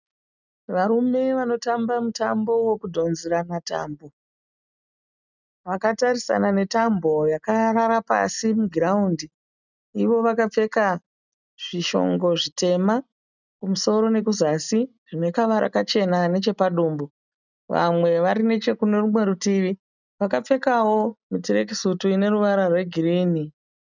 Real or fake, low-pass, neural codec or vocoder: real; 7.2 kHz; none